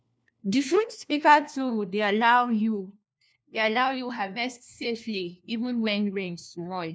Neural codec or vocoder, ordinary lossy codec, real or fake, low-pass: codec, 16 kHz, 1 kbps, FunCodec, trained on LibriTTS, 50 frames a second; none; fake; none